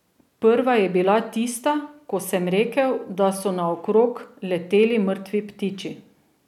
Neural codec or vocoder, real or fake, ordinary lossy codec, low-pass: none; real; none; 19.8 kHz